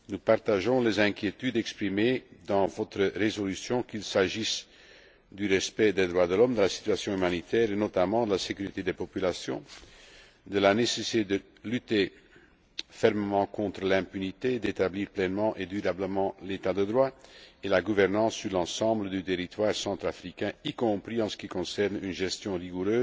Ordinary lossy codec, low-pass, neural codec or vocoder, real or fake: none; none; none; real